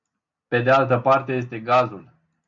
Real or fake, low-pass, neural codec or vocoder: real; 7.2 kHz; none